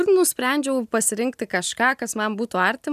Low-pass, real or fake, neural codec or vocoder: 14.4 kHz; real; none